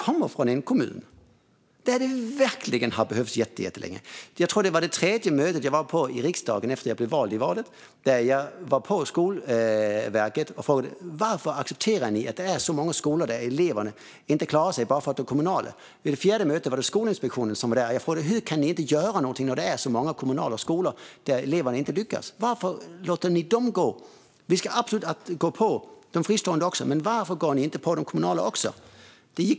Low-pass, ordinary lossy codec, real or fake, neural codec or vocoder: none; none; real; none